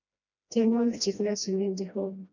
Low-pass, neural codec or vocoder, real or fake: 7.2 kHz; codec, 16 kHz, 1 kbps, FreqCodec, smaller model; fake